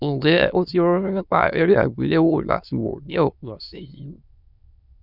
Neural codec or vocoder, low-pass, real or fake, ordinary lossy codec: autoencoder, 22.05 kHz, a latent of 192 numbers a frame, VITS, trained on many speakers; 5.4 kHz; fake; none